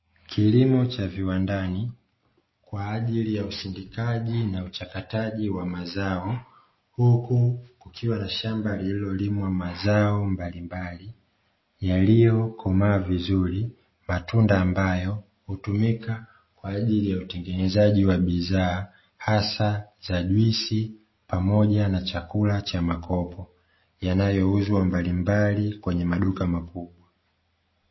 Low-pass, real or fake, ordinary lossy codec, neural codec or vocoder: 7.2 kHz; real; MP3, 24 kbps; none